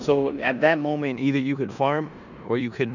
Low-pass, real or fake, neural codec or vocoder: 7.2 kHz; fake; codec, 16 kHz in and 24 kHz out, 0.9 kbps, LongCat-Audio-Codec, four codebook decoder